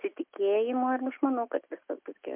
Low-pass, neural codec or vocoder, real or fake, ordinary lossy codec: 3.6 kHz; none; real; MP3, 32 kbps